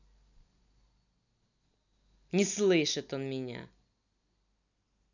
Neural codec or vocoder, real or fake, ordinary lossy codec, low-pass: none; real; none; 7.2 kHz